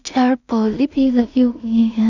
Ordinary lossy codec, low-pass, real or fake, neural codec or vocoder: none; 7.2 kHz; fake; codec, 16 kHz in and 24 kHz out, 0.4 kbps, LongCat-Audio-Codec, two codebook decoder